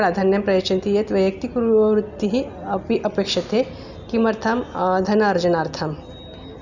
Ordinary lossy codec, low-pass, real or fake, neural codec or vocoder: none; 7.2 kHz; real; none